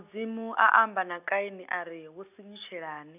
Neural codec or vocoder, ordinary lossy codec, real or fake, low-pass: none; none; real; 3.6 kHz